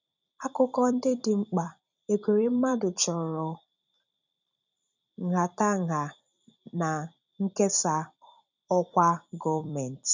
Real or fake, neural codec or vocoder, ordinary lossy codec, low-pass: fake; vocoder, 44.1 kHz, 128 mel bands every 256 samples, BigVGAN v2; none; 7.2 kHz